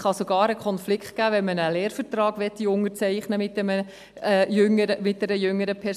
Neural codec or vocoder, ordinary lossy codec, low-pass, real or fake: none; AAC, 96 kbps; 14.4 kHz; real